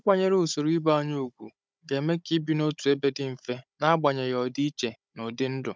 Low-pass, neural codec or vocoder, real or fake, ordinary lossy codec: none; codec, 16 kHz, 16 kbps, FunCodec, trained on Chinese and English, 50 frames a second; fake; none